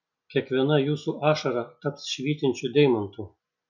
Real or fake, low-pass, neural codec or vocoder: real; 7.2 kHz; none